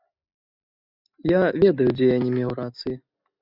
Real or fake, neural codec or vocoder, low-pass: real; none; 5.4 kHz